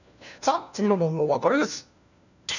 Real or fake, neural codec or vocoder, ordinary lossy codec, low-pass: fake; codec, 16 kHz, 1 kbps, FunCodec, trained on LibriTTS, 50 frames a second; none; 7.2 kHz